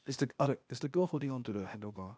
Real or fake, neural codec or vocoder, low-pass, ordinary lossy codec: fake; codec, 16 kHz, 0.8 kbps, ZipCodec; none; none